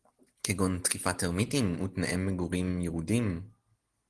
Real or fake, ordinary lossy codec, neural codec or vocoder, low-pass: real; Opus, 16 kbps; none; 10.8 kHz